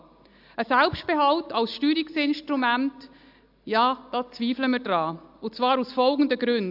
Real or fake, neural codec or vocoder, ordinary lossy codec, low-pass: real; none; AAC, 48 kbps; 5.4 kHz